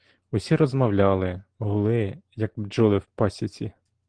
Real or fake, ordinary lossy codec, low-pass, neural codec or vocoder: real; Opus, 16 kbps; 9.9 kHz; none